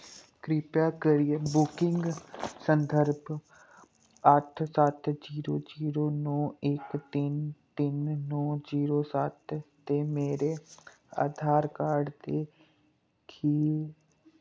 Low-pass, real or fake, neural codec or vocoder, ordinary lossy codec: none; real; none; none